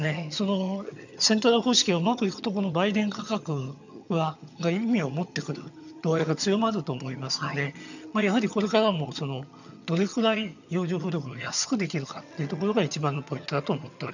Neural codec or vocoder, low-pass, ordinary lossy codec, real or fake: vocoder, 22.05 kHz, 80 mel bands, HiFi-GAN; 7.2 kHz; none; fake